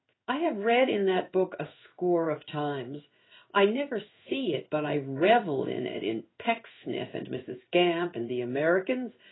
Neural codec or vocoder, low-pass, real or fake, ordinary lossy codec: codec, 16 kHz in and 24 kHz out, 1 kbps, XY-Tokenizer; 7.2 kHz; fake; AAC, 16 kbps